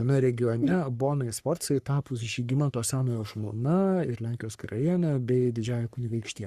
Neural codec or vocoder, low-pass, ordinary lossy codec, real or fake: codec, 44.1 kHz, 3.4 kbps, Pupu-Codec; 14.4 kHz; Opus, 64 kbps; fake